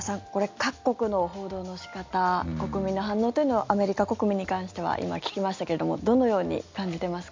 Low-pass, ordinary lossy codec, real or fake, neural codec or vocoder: 7.2 kHz; MP3, 64 kbps; real; none